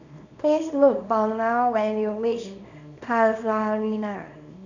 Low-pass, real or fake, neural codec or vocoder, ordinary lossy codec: 7.2 kHz; fake; codec, 24 kHz, 0.9 kbps, WavTokenizer, small release; none